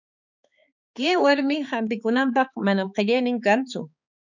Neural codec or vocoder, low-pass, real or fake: codec, 16 kHz, 4 kbps, X-Codec, HuBERT features, trained on balanced general audio; 7.2 kHz; fake